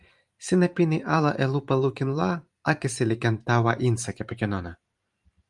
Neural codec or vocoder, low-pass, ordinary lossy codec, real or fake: vocoder, 44.1 kHz, 128 mel bands every 512 samples, BigVGAN v2; 10.8 kHz; Opus, 32 kbps; fake